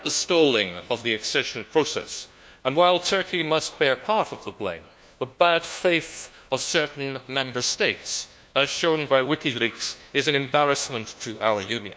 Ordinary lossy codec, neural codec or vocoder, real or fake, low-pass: none; codec, 16 kHz, 1 kbps, FunCodec, trained on LibriTTS, 50 frames a second; fake; none